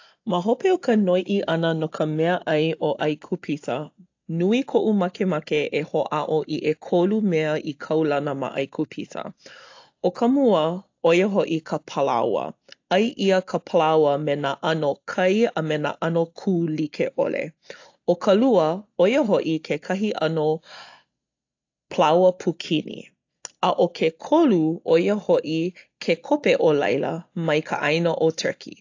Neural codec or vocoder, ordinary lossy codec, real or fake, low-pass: none; AAC, 48 kbps; real; 7.2 kHz